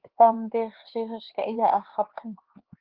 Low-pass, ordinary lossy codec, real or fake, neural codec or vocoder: 5.4 kHz; Opus, 16 kbps; fake; codec, 16 kHz, 4 kbps, X-Codec, HuBERT features, trained on LibriSpeech